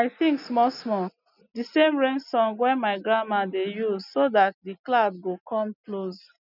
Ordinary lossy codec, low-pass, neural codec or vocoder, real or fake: none; 5.4 kHz; none; real